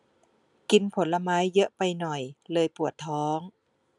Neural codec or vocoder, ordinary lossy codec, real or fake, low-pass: none; none; real; 10.8 kHz